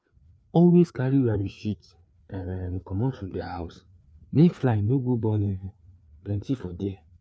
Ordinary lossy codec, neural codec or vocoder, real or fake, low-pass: none; codec, 16 kHz, 4 kbps, FreqCodec, larger model; fake; none